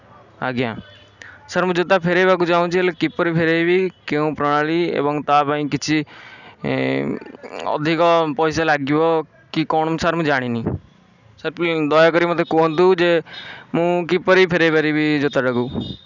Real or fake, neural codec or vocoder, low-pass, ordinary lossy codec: real; none; 7.2 kHz; none